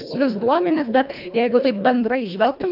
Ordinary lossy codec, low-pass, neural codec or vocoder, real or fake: AAC, 48 kbps; 5.4 kHz; codec, 24 kHz, 1.5 kbps, HILCodec; fake